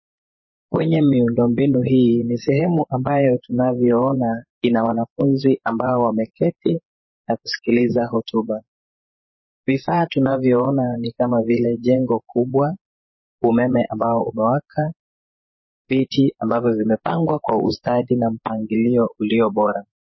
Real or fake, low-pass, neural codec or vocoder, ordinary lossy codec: fake; 7.2 kHz; vocoder, 24 kHz, 100 mel bands, Vocos; MP3, 24 kbps